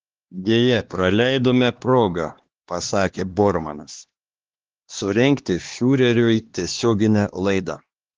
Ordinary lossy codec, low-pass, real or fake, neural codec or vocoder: Opus, 16 kbps; 7.2 kHz; fake; codec, 16 kHz, 2 kbps, X-Codec, HuBERT features, trained on LibriSpeech